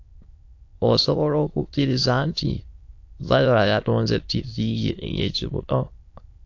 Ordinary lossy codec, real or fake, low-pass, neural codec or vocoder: AAC, 48 kbps; fake; 7.2 kHz; autoencoder, 22.05 kHz, a latent of 192 numbers a frame, VITS, trained on many speakers